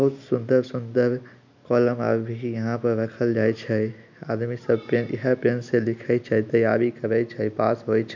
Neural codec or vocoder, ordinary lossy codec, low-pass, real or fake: none; MP3, 64 kbps; 7.2 kHz; real